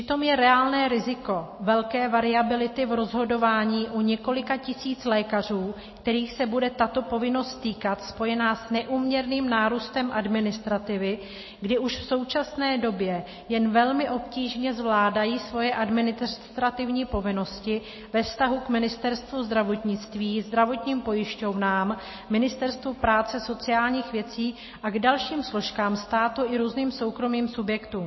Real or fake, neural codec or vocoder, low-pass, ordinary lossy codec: real; none; 7.2 kHz; MP3, 24 kbps